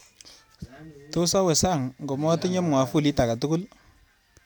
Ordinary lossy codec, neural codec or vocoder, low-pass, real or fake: none; none; none; real